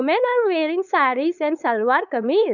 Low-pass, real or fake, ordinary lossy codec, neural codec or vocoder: 7.2 kHz; fake; none; codec, 16 kHz, 4.8 kbps, FACodec